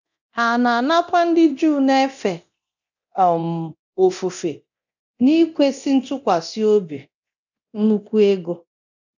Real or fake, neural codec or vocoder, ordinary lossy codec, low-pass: fake; codec, 24 kHz, 0.9 kbps, DualCodec; none; 7.2 kHz